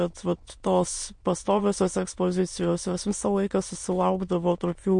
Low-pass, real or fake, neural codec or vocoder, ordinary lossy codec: 9.9 kHz; fake; autoencoder, 22.05 kHz, a latent of 192 numbers a frame, VITS, trained on many speakers; MP3, 48 kbps